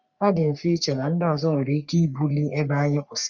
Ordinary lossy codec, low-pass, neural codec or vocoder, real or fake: none; 7.2 kHz; codec, 44.1 kHz, 3.4 kbps, Pupu-Codec; fake